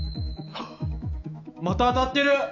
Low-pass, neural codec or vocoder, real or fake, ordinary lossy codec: 7.2 kHz; autoencoder, 48 kHz, 128 numbers a frame, DAC-VAE, trained on Japanese speech; fake; none